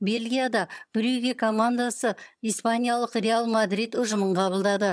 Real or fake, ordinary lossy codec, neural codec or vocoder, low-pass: fake; none; vocoder, 22.05 kHz, 80 mel bands, HiFi-GAN; none